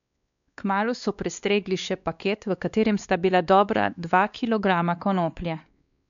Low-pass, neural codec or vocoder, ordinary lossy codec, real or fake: 7.2 kHz; codec, 16 kHz, 2 kbps, X-Codec, WavLM features, trained on Multilingual LibriSpeech; none; fake